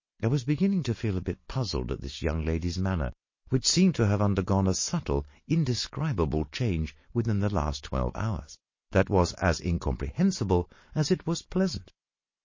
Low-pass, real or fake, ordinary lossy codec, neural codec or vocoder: 7.2 kHz; real; MP3, 32 kbps; none